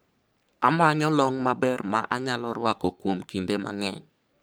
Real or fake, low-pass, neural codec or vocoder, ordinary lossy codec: fake; none; codec, 44.1 kHz, 3.4 kbps, Pupu-Codec; none